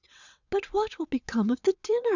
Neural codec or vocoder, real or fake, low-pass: vocoder, 22.05 kHz, 80 mel bands, WaveNeXt; fake; 7.2 kHz